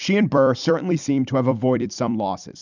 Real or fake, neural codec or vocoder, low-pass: fake; vocoder, 44.1 kHz, 128 mel bands every 256 samples, BigVGAN v2; 7.2 kHz